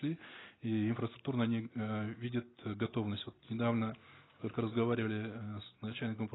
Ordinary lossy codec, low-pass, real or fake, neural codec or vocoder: AAC, 16 kbps; 7.2 kHz; real; none